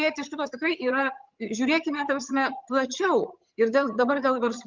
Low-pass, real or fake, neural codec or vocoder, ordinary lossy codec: 7.2 kHz; fake; vocoder, 22.05 kHz, 80 mel bands, HiFi-GAN; Opus, 32 kbps